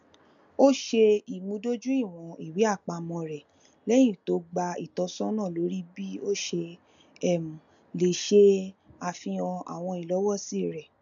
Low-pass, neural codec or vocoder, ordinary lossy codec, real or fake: 7.2 kHz; none; none; real